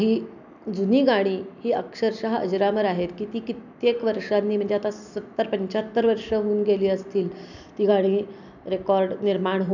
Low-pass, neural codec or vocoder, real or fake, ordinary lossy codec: 7.2 kHz; none; real; none